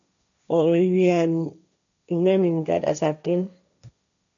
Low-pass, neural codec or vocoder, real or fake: 7.2 kHz; codec, 16 kHz, 1.1 kbps, Voila-Tokenizer; fake